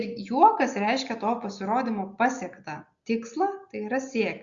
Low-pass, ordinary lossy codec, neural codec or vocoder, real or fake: 7.2 kHz; Opus, 64 kbps; none; real